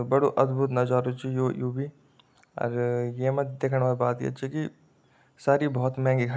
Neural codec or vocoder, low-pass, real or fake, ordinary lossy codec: none; none; real; none